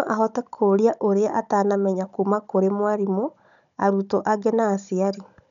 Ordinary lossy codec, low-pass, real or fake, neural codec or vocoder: none; 7.2 kHz; fake; codec, 16 kHz, 16 kbps, FunCodec, trained on Chinese and English, 50 frames a second